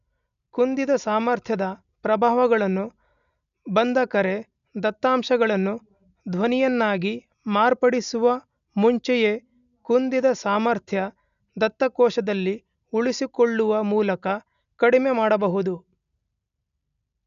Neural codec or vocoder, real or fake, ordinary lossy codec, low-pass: none; real; none; 7.2 kHz